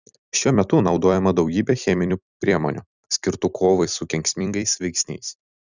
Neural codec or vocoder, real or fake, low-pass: vocoder, 44.1 kHz, 128 mel bands every 256 samples, BigVGAN v2; fake; 7.2 kHz